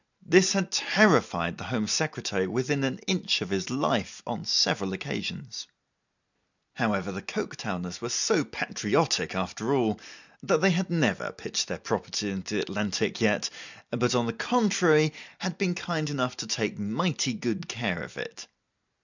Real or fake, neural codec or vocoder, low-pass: real; none; 7.2 kHz